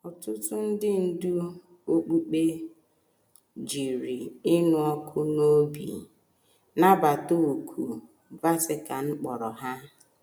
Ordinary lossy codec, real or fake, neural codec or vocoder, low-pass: none; real; none; none